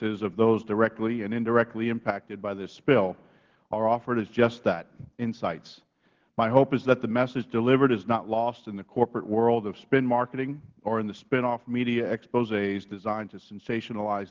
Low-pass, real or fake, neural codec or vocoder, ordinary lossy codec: 7.2 kHz; real; none; Opus, 16 kbps